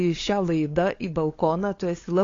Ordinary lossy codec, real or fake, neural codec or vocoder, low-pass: AAC, 32 kbps; fake; codec, 16 kHz, 8 kbps, FunCodec, trained on LibriTTS, 25 frames a second; 7.2 kHz